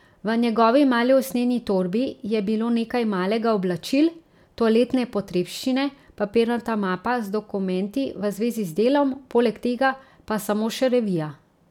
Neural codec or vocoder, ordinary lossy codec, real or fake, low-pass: none; none; real; 19.8 kHz